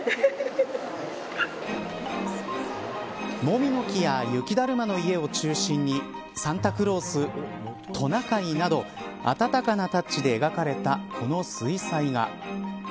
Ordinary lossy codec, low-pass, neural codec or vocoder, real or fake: none; none; none; real